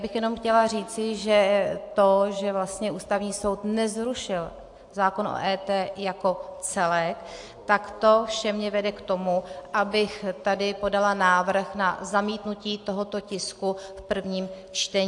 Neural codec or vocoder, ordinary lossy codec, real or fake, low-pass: none; AAC, 64 kbps; real; 10.8 kHz